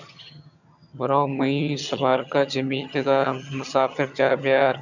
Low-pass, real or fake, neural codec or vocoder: 7.2 kHz; fake; vocoder, 22.05 kHz, 80 mel bands, HiFi-GAN